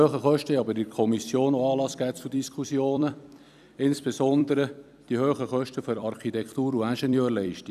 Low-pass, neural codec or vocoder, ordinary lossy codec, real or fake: 14.4 kHz; vocoder, 44.1 kHz, 128 mel bands every 256 samples, BigVGAN v2; none; fake